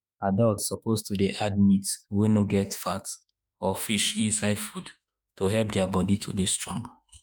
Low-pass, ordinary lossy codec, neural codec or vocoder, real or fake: none; none; autoencoder, 48 kHz, 32 numbers a frame, DAC-VAE, trained on Japanese speech; fake